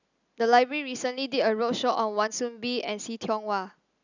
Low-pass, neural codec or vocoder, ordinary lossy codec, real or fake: 7.2 kHz; none; none; real